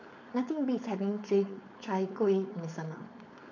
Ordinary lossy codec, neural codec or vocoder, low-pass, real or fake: none; codec, 16 kHz, 4.8 kbps, FACodec; 7.2 kHz; fake